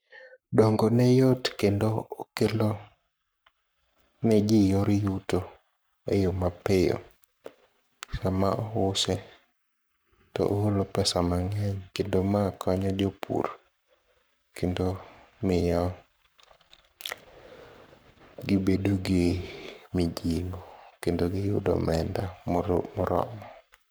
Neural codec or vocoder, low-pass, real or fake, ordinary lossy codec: codec, 44.1 kHz, 7.8 kbps, Pupu-Codec; none; fake; none